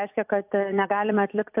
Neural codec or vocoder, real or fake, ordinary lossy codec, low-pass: vocoder, 44.1 kHz, 128 mel bands every 256 samples, BigVGAN v2; fake; AAC, 32 kbps; 3.6 kHz